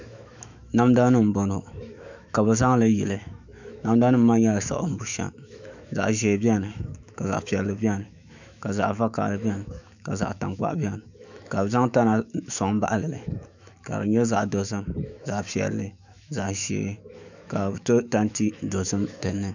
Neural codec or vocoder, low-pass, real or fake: autoencoder, 48 kHz, 128 numbers a frame, DAC-VAE, trained on Japanese speech; 7.2 kHz; fake